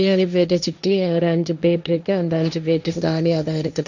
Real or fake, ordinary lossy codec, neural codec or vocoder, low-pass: fake; none; codec, 16 kHz, 1.1 kbps, Voila-Tokenizer; 7.2 kHz